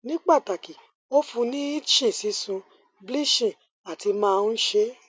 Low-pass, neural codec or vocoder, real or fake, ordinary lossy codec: none; none; real; none